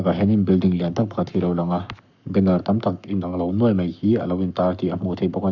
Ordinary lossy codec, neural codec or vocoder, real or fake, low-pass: none; codec, 44.1 kHz, 7.8 kbps, Pupu-Codec; fake; 7.2 kHz